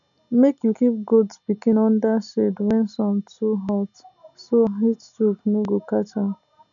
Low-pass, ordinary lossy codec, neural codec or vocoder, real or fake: 7.2 kHz; none; none; real